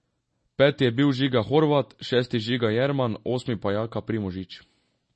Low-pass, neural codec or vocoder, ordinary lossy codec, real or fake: 10.8 kHz; none; MP3, 32 kbps; real